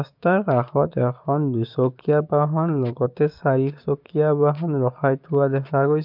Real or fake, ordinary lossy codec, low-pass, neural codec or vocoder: fake; MP3, 48 kbps; 5.4 kHz; codec, 16 kHz, 6 kbps, DAC